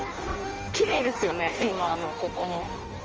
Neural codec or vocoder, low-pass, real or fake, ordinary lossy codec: codec, 16 kHz in and 24 kHz out, 0.6 kbps, FireRedTTS-2 codec; 7.2 kHz; fake; Opus, 24 kbps